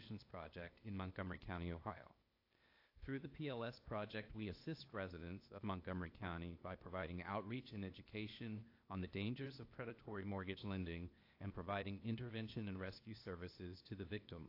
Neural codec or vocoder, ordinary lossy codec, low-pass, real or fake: codec, 16 kHz, 0.8 kbps, ZipCodec; AAC, 32 kbps; 5.4 kHz; fake